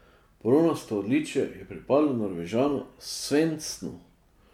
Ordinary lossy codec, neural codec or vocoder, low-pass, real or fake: MP3, 96 kbps; none; 19.8 kHz; real